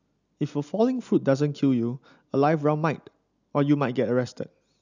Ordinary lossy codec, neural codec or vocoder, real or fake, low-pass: none; none; real; 7.2 kHz